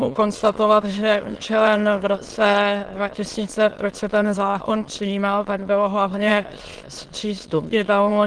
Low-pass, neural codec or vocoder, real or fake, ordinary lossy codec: 9.9 kHz; autoencoder, 22.05 kHz, a latent of 192 numbers a frame, VITS, trained on many speakers; fake; Opus, 16 kbps